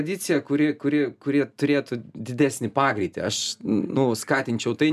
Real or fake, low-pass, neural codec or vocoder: real; 14.4 kHz; none